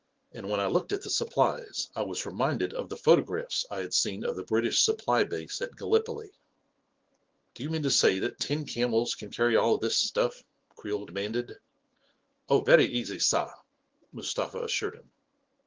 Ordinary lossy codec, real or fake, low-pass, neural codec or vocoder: Opus, 16 kbps; fake; 7.2 kHz; autoencoder, 48 kHz, 128 numbers a frame, DAC-VAE, trained on Japanese speech